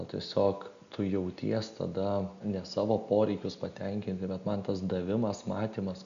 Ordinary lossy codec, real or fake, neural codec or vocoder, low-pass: MP3, 96 kbps; real; none; 7.2 kHz